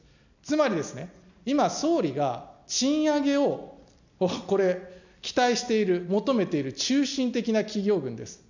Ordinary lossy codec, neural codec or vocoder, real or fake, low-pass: none; none; real; 7.2 kHz